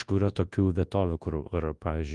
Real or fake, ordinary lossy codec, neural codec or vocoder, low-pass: fake; Opus, 24 kbps; codec, 24 kHz, 0.9 kbps, WavTokenizer, large speech release; 10.8 kHz